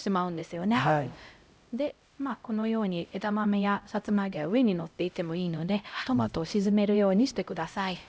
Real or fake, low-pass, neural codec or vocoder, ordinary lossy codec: fake; none; codec, 16 kHz, 0.5 kbps, X-Codec, HuBERT features, trained on LibriSpeech; none